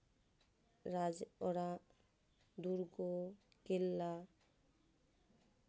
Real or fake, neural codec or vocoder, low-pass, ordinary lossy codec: real; none; none; none